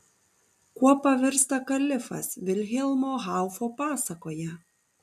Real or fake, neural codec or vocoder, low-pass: real; none; 14.4 kHz